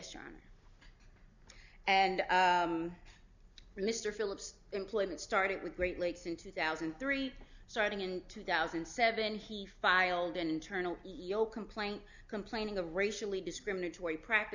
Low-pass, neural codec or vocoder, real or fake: 7.2 kHz; none; real